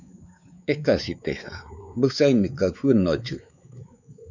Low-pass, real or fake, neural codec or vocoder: 7.2 kHz; fake; codec, 16 kHz, 4 kbps, X-Codec, WavLM features, trained on Multilingual LibriSpeech